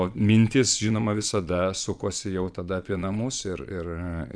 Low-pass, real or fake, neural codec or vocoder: 9.9 kHz; fake; vocoder, 22.05 kHz, 80 mel bands, Vocos